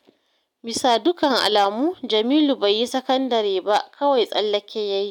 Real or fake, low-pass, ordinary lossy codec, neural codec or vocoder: real; 19.8 kHz; none; none